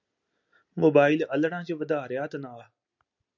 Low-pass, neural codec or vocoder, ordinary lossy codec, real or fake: 7.2 kHz; none; MP3, 64 kbps; real